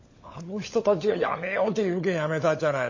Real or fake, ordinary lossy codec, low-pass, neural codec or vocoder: fake; MP3, 32 kbps; 7.2 kHz; codec, 16 kHz, 16 kbps, FunCodec, trained on LibriTTS, 50 frames a second